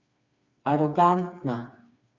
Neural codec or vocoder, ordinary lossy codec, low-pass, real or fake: codec, 16 kHz, 4 kbps, FreqCodec, smaller model; Opus, 64 kbps; 7.2 kHz; fake